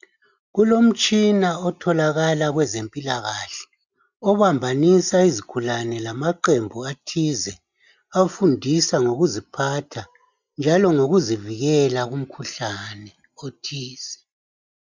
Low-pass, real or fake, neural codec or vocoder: 7.2 kHz; real; none